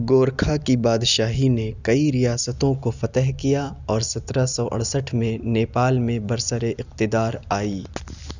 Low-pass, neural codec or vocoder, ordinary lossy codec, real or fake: 7.2 kHz; none; none; real